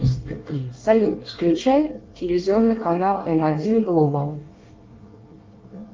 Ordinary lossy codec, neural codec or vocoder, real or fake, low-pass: Opus, 24 kbps; codec, 24 kHz, 1 kbps, SNAC; fake; 7.2 kHz